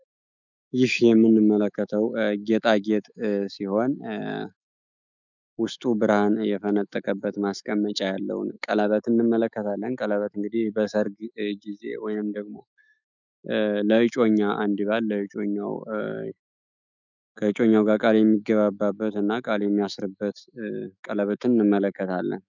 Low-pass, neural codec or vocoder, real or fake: 7.2 kHz; autoencoder, 48 kHz, 128 numbers a frame, DAC-VAE, trained on Japanese speech; fake